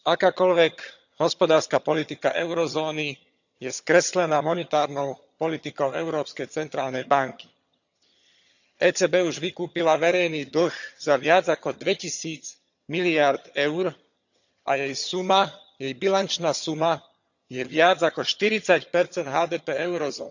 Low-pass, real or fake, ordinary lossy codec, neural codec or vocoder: 7.2 kHz; fake; none; vocoder, 22.05 kHz, 80 mel bands, HiFi-GAN